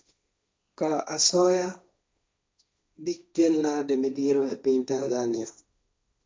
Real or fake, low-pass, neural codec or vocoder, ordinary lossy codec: fake; none; codec, 16 kHz, 1.1 kbps, Voila-Tokenizer; none